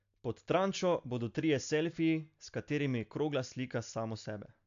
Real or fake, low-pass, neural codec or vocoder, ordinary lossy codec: real; 7.2 kHz; none; AAC, 64 kbps